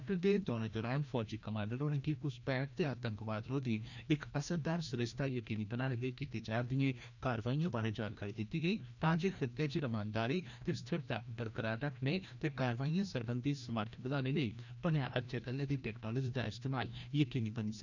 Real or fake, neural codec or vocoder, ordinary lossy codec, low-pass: fake; codec, 16 kHz, 1 kbps, FreqCodec, larger model; none; 7.2 kHz